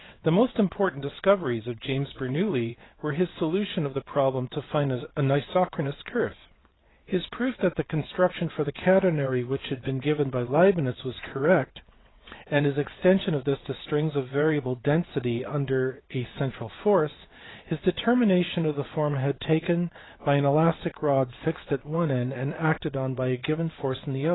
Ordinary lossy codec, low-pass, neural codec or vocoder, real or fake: AAC, 16 kbps; 7.2 kHz; vocoder, 22.05 kHz, 80 mel bands, WaveNeXt; fake